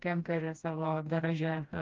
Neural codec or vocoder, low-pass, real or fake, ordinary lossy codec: codec, 16 kHz, 1 kbps, FreqCodec, smaller model; 7.2 kHz; fake; Opus, 32 kbps